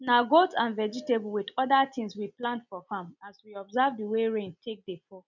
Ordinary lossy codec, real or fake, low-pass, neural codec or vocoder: none; real; 7.2 kHz; none